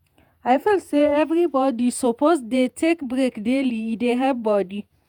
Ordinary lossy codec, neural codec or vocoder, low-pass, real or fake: none; vocoder, 48 kHz, 128 mel bands, Vocos; 19.8 kHz; fake